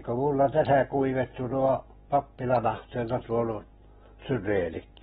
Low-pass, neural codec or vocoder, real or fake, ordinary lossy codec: 19.8 kHz; none; real; AAC, 16 kbps